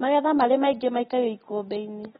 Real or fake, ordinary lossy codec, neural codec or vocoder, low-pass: real; AAC, 16 kbps; none; 10.8 kHz